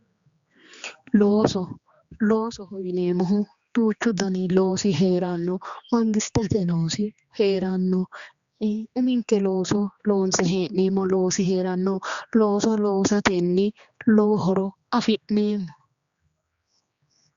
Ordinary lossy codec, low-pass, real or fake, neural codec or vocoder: Opus, 64 kbps; 7.2 kHz; fake; codec, 16 kHz, 2 kbps, X-Codec, HuBERT features, trained on general audio